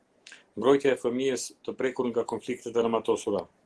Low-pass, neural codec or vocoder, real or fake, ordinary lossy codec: 10.8 kHz; none; real; Opus, 16 kbps